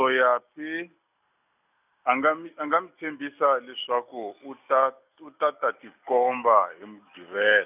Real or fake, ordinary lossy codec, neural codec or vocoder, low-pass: real; none; none; 3.6 kHz